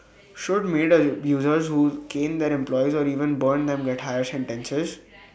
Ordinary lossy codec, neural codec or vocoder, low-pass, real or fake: none; none; none; real